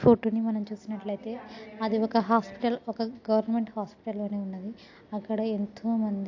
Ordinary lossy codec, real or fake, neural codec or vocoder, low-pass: AAC, 48 kbps; real; none; 7.2 kHz